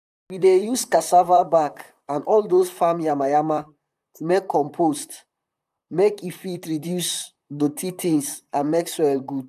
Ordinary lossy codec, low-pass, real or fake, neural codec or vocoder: none; 14.4 kHz; fake; vocoder, 44.1 kHz, 128 mel bands, Pupu-Vocoder